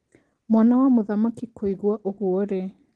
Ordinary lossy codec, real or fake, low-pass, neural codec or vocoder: Opus, 16 kbps; real; 10.8 kHz; none